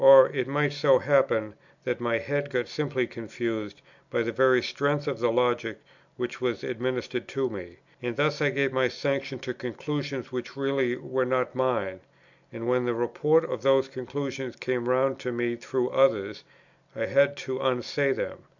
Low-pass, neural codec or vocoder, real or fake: 7.2 kHz; none; real